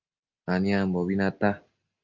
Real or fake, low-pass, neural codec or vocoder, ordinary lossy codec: real; 7.2 kHz; none; Opus, 24 kbps